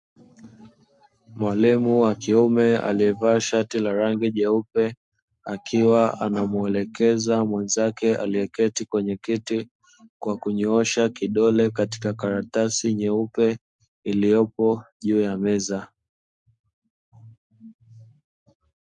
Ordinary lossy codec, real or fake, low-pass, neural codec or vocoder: MP3, 64 kbps; fake; 10.8 kHz; codec, 44.1 kHz, 7.8 kbps, Pupu-Codec